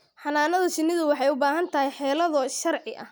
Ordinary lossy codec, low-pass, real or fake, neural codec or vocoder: none; none; real; none